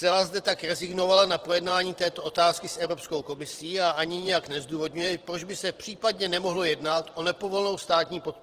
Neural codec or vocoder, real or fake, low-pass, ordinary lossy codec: vocoder, 44.1 kHz, 128 mel bands every 512 samples, BigVGAN v2; fake; 14.4 kHz; Opus, 16 kbps